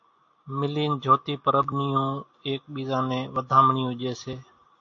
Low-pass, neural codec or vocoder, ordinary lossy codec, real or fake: 7.2 kHz; none; AAC, 48 kbps; real